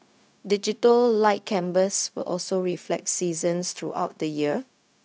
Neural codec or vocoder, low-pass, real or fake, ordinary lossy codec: codec, 16 kHz, 0.4 kbps, LongCat-Audio-Codec; none; fake; none